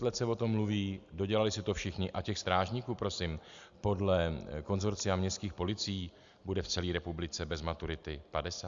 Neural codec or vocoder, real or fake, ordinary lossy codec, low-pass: none; real; Opus, 64 kbps; 7.2 kHz